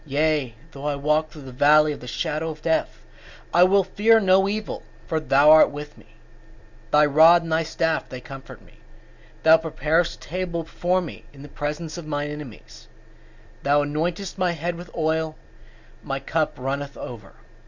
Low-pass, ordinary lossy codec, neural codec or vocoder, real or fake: 7.2 kHz; Opus, 64 kbps; none; real